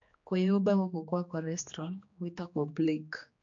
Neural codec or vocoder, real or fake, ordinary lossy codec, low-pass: codec, 16 kHz, 2 kbps, X-Codec, HuBERT features, trained on general audio; fake; MP3, 48 kbps; 7.2 kHz